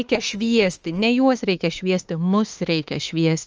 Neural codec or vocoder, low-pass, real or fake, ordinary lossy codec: autoencoder, 48 kHz, 32 numbers a frame, DAC-VAE, trained on Japanese speech; 7.2 kHz; fake; Opus, 24 kbps